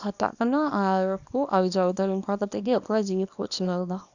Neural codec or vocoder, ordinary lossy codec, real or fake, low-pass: codec, 24 kHz, 0.9 kbps, WavTokenizer, small release; none; fake; 7.2 kHz